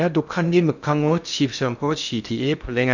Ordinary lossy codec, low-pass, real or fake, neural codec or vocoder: none; 7.2 kHz; fake; codec, 16 kHz in and 24 kHz out, 0.6 kbps, FocalCodec, streaming, 2048 codes